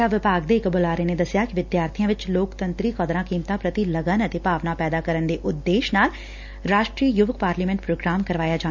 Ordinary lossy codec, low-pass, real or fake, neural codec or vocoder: none; 7.2 kHz; real; none